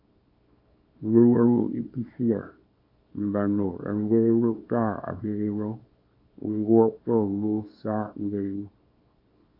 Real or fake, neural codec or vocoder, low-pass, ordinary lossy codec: fake; codec, 24 kHz, 0.9 kbps, WavTokenizer, small release; 5.4 kHz; AAC, 32 kbps